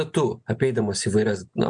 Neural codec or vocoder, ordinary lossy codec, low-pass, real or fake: none; MP3, 64 kbps; 9.9 kHz; real